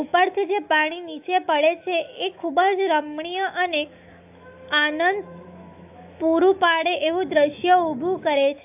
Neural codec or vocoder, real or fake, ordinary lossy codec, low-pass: autoencoder, 48 kHz, 128 numbers a frame, DAC-VAE, trained on Japanese speech; fake; AAC, 32 kbps; 3.6 kHz